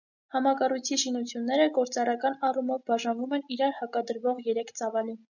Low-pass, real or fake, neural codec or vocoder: 7.2 kHz; real; none